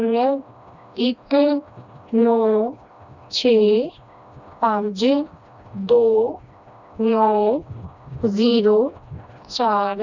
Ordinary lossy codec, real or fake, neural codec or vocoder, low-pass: none; fake; codec, 16 kHz, 1 kbps, FreqCodec, smaller model; 7.2 kHz